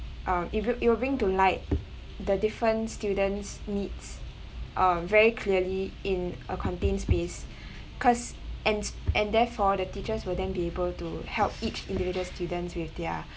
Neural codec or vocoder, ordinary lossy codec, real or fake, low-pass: none; none; real; none